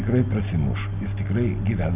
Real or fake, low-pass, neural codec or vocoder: real; 3.6 kHz; none